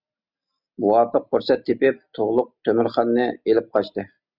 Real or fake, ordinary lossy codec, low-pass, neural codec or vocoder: real; Opus, 64 kbps; 5.4 kHz; none